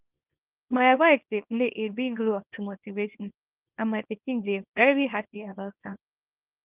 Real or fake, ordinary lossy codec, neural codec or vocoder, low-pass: fake; Opus, 24 kbps; codec, 24 kHz, 0.9 kbps, WavTokenizer, small release; 3.6 kHz